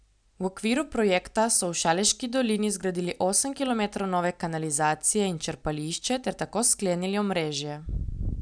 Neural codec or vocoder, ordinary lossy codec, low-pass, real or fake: none; none; 9.9 kHz; real